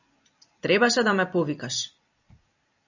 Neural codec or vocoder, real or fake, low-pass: vocoder, 44.1 kHz, 128 mel bands every 256 samples, BigVGAN v2; fake; 7.2 kHz